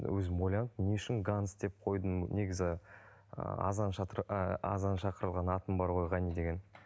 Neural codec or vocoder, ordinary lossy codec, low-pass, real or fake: none; none; none; real